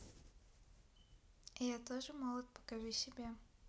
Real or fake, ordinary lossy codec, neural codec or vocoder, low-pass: real; none; none; none